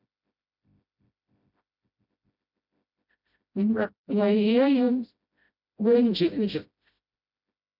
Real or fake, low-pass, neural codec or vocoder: fake; 5.4 kHz; codec, 16 kHz, 0.5 kbps, FreqCodec, smaller model